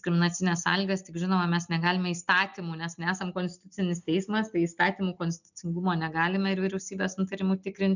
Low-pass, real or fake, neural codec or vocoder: 7.2 kHz; real; none